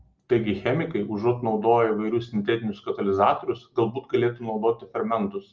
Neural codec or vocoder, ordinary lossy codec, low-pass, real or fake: none; Opus, 24 kbps; 7.2 kHz; real